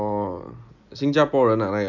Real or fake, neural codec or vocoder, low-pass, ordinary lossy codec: real; none; 7.2 kHz; none